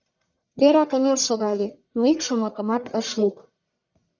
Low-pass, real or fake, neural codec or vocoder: 7.2 kHz; fake; codec, 44.1 kHz, 1.7 kbps, Pupu-Codec